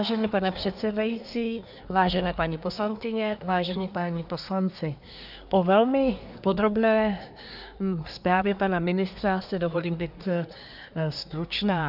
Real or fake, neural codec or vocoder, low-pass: fake; codec, 24 kHz, 1 kbps, SNAC; 5.4 kHz